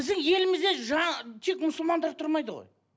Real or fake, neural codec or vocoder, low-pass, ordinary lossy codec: real; none; none; none